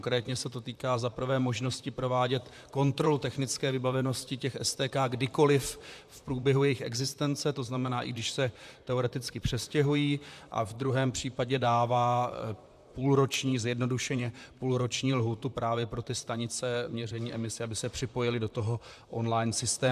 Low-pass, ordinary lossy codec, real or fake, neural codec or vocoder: 14.4 kHz; AAC, 96 kbps; fake; vocoder, 44.1 kHz, 128 mel bands, Pupu-Vocoder